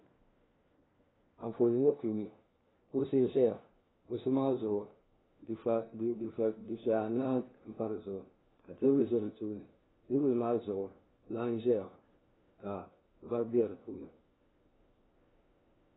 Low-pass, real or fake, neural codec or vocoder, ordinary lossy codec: 7.2 kHz; fake; codec, 16 kHz, 1 kbps, FunCodec, trained on LibriTTS, 50 frames a second; AAC, 16 kbps